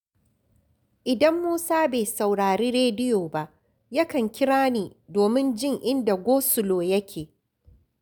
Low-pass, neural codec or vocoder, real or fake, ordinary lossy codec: none; none; real; none